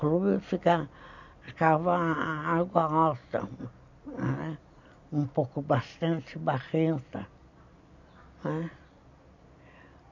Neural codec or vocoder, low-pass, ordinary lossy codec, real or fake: none; 7.2 kHz; none; real